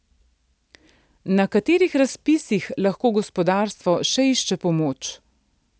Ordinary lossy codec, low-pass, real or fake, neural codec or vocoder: none; none; real; none